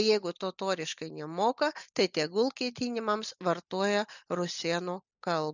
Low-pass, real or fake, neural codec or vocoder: 7.2 kHz; real; none